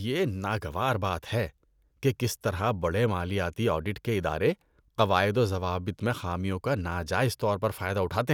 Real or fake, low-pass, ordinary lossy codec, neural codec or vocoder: real; 19.8 kHz; none; none